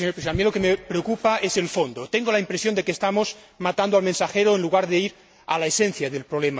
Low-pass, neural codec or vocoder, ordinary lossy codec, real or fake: none; none; none; real